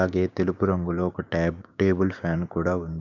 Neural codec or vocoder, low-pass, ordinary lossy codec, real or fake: codec, 16 kHz, 6 kbps, DAC; 7.2 kHz; none; fake